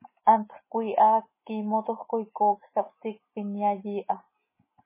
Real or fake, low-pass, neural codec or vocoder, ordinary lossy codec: real; 3.6 kHz; none; MP3, 16 kbps